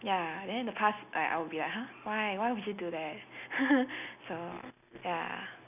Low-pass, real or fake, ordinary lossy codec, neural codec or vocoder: 3.6 kHz; real; none; none